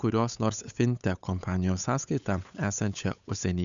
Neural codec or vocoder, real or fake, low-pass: codec, 16 kHz, 8 kbps, FunCodec, trained on Chinese and English, 25 frames a second; fake; 7.2 kHz